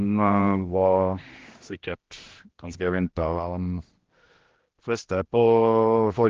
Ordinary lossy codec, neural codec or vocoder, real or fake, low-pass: Opus, 16 kbps; codec, 16 kHz, 1 kbps, X-Codec, HuBERT features, trained on general audio; fake; 7.2 kHz